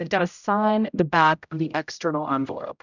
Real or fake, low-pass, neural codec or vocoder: fake; 7.2 kHz; codec, 16 kHz, 0.5 kbps, X-Codec, HuBERT features, trained on general audio